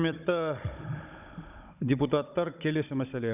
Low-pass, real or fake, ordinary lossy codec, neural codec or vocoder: 3.6 kHz; fake; none; codec, 16 kHz, 16 kbps, FunCodec, trained on Chinese and English, 50 frames a second